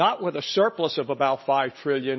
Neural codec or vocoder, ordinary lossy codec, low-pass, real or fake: none; MP3, 24 kbps; 7.2 kHz; real